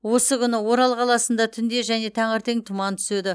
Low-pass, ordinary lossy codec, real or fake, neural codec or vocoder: none; none; real; none